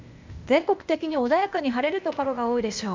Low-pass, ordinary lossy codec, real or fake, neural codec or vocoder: 7.2 kHz; MP3, 64 kbps; fake; codec, 16 kHz, 0.8 kbps, ZipCodec